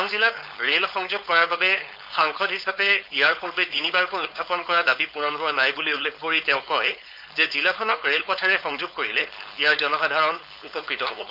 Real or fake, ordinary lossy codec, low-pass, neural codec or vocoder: fake; Opus, 64 kbps; 5.4 kHz; codec, 16 kHz, 4.8 kbps, FACodec